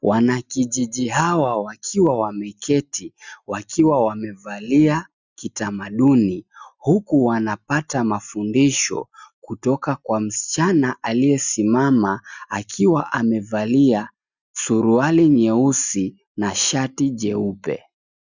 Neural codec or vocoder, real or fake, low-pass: none; real; 7.2 kHz